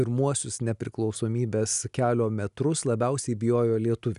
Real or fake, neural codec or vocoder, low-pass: real; none; 10.8 kHz